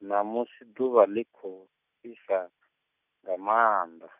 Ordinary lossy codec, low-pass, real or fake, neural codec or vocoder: none; 3.6 kHz; real; none